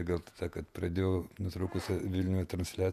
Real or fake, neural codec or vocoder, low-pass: fake; vocoder, 48 kHz, 128 mel bands, Vocos; 14.4 kHz